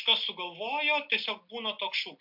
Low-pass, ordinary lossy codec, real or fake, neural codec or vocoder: 5.4 kHz; MP3, 48 kbps; real; none